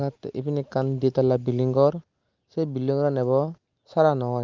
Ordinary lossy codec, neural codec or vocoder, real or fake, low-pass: Opus, 32 kbps; none; real; 7.2 kHz